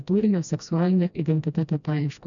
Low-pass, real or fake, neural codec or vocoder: 7.2 kHz; fake; codec, 16 kHz, 1 kbps, FreqCodec, smaller model